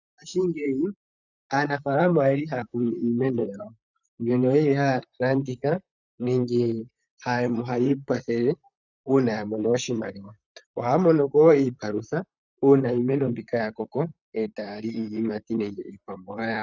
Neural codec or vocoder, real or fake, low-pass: vocoder, 44.1 kHz, 128 mel bands, Pupu-Vocoder; fake; 7.2 kHz